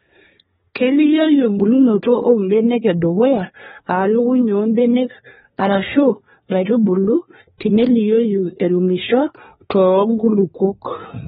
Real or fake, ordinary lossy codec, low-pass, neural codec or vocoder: fake; AAC, 16 kbps; 10.8 kHz; codec, 24 kHz, 1 kbps, SNAC